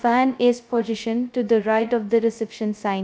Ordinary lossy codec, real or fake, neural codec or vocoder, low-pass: none; fake; codec, 16 kHz, 0.2 kbps, FocalCodec; none